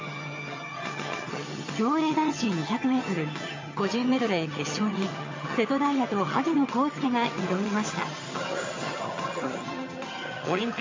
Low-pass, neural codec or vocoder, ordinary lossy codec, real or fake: 7.2 kHz; vocoder, 22.05 kHz, 80 mel bands, HiFi-GAN; MP3, 32 kbps; fake